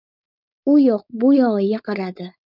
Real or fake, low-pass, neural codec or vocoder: fake; 5.4 kHz; codec, 16 kHz, 4.8 kbps, FACodec